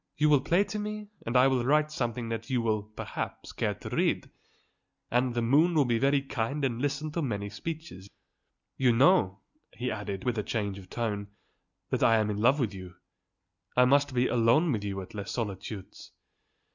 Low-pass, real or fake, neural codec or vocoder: 7.2 kHz; real; none